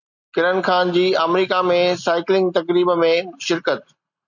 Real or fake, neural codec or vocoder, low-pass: real; none; 7.2 kHz